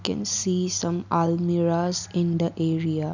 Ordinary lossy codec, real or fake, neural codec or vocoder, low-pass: none; real; none; 7.2 kHz